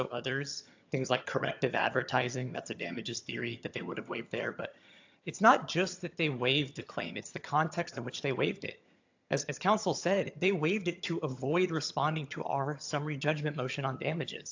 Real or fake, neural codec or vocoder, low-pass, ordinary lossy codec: fake; vocoder, 22.05 kHz, 80 mel bands, HiFi-GAN; 7.2 kHz; AAC, 48 kbps